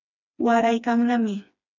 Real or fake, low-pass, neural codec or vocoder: fake; 7.2 kHz; codec, 16 kHz, 2 kbps, FreqCodec, smaller model